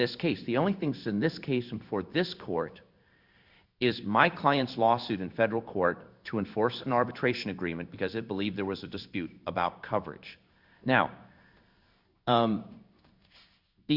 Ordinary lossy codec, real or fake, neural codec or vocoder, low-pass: Opus, 64 kbps; fake; codec, 16 kHz in and 24 kHz out, 1 kbps, XY-Tokenizer; 5.4 kHz